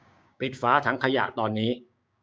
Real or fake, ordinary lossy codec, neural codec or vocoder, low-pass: fake; none; codec, 16 kHz, 6 kbps, DAC; none